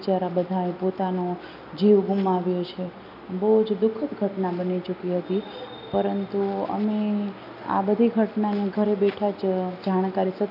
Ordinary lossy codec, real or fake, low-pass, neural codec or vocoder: none; real; 5.4 kHz; none